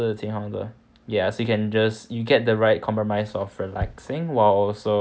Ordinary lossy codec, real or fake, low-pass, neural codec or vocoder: none; real; none; none